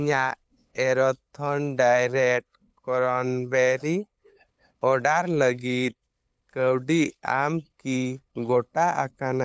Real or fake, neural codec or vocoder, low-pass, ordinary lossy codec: fake; codec, 16 kHz, 8 kbps, FunCodec, trained on LibriTTS, 25 frames a second; none; none